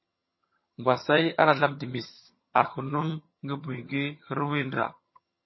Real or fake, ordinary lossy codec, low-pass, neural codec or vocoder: fake; MP3, 24 kbps; 7.2 kHz; vocoder, 22.05 kHz, 80 mel bands, HiFi-GAN